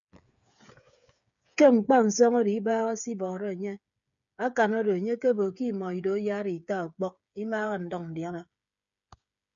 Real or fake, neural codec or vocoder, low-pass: fake; codec, 16 kHz, 8 kbps, FreqCodec, smaller model; 7.2 kHz